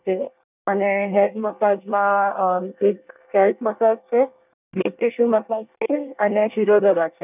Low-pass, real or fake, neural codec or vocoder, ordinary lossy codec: 3.6 kHz; fake; codec, 24 kHz, 1 kbps, SNAC; none